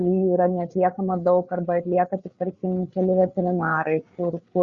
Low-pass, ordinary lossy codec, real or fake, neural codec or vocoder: 7.2 kHz; Opus, 64 kbps; fake; codec, 16 kHz, 8 kbps, FreqCodec, larger model